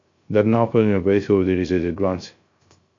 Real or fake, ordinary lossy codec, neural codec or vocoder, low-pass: fake; MP3, 48 kbps; codec, 16 kHz, 0.3 kbps, FocalCodec; 7.2 kHz